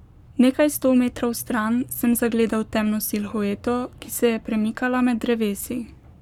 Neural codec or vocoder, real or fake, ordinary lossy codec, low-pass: codec, 44.1 kHz, 7.8 kbps, Pupu-Codec; fake; none; 19.8 kHz